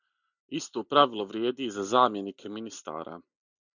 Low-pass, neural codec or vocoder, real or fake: 7.2 kHz; none; real